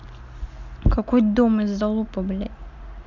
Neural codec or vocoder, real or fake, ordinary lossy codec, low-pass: none; real; none; 7.2 kHz